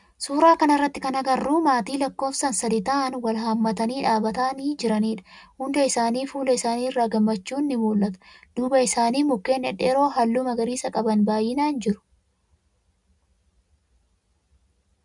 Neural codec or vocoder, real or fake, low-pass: none; real; 10.8 kHz